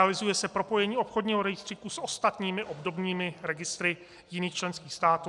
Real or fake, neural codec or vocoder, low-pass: real; none; 10.8 kHz